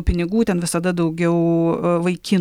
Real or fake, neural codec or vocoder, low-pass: real; none; 19.8 kHz